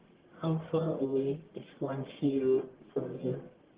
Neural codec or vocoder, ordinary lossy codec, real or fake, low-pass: codec, 44.1 kHz, 1.7 kbps, Pupu-Codec; Opus, 16 kbps; fake; 3.6 kHz